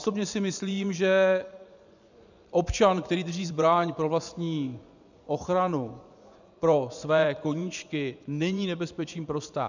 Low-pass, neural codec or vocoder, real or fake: 7.2 kHz; vocoder, 24 kHz, 100 mel bands, Vocos; fake